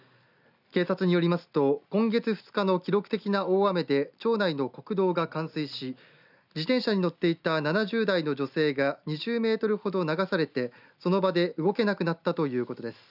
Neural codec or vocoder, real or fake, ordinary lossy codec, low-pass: none; real; none; 5.4 kHz